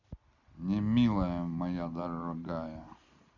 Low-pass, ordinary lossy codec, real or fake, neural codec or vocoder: 7.2 kHz; none; real; none